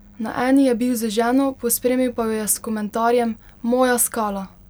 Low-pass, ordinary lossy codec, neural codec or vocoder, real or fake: none; none; none; real